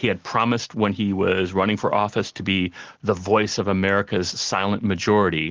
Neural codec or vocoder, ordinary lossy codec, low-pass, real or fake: none; Opus, 16 kbps; 7.2 kHz; real